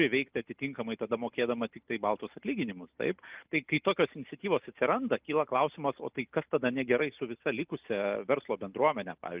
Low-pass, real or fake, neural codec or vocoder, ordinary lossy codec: 3.6 kHz; real; none; Opus, 24 kbps